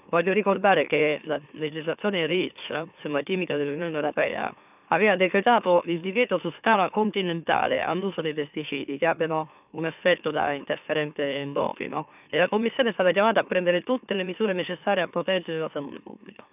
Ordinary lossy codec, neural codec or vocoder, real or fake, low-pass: none; autoencoder, 44.1 kHz, a latent of 192 numbers a frame, MeloTTS; fake; 3.6 kHz